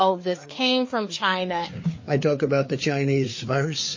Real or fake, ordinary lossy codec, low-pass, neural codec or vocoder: fake; MP3, 32 kbps; 7.2 kHz; codec, 16 kHz, 4 kbps, FreqCodec, larger model